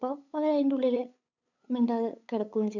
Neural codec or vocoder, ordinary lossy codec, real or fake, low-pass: codec, 16 kHz, 8 kbps, FunCodec, trained on LibriTTS, 25 frames a second; none; fake; 7.2 kHz